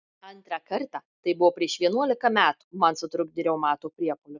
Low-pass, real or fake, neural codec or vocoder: 7.2 kHz; real; none